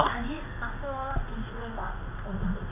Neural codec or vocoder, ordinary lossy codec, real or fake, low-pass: codec, 16 kHz in and 24 kHz out, 1 kbps, XY-Tokenizer; none; fake; 3.6 kHz